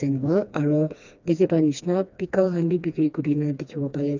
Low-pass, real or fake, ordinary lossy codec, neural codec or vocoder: 7.2 kHz; fake; none; codec, 16 kHz, 2 kbps, FreqCodec, smaller model